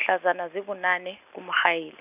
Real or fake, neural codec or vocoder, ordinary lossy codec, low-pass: real; none; none; 3.6 kHz